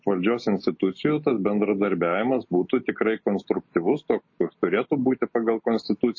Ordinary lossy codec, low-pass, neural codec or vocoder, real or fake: MP3, 32 kbps; 7.2 kHz; none; real